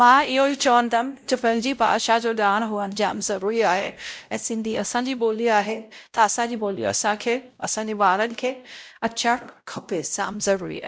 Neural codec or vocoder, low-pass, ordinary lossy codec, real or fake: codec, 16 kHz, 0.5 kbps, X-Codec, WavLM features, trained on Multilingual LibriSpeech; none; none; fake